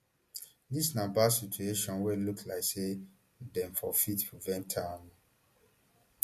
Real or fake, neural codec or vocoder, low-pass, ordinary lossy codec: real; none; 14.4 kHz; MP3, 64 kbps